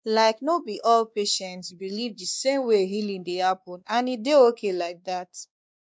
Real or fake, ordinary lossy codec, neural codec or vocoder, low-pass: fake; none; codec, 16 kHz, 2 kbps, X-Codec, WavLM features, trained on Multilingual LibriSpeech; none